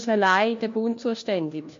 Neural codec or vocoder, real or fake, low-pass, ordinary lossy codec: codec, 16 kHz, 1 kbps, FunCodec, trained on LibriTTS, 50 frames a second; fake; 7.2 kHz; MP3, 48 kbps